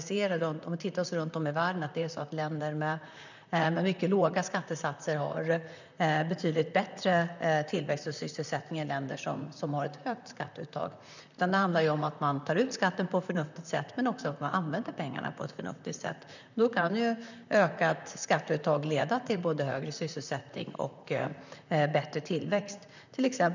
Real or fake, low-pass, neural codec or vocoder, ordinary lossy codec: fake; 7.2 kHz; vocoder, 44.1 kHz, 128 mel bands, Pupu-Vocoder; none